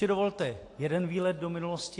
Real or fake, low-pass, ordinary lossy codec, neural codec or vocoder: real; 10.8 kHz; AAC, 48 kbps; none